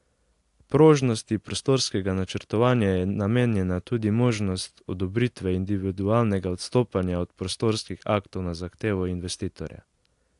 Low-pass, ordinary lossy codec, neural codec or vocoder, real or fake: 10.8 kHz; AAC, 64 kbps; none; real